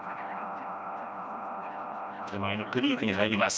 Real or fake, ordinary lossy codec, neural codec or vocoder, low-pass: fake; none; codec, 16 kHz, 1 kbps, FreqCodec, smaller model; none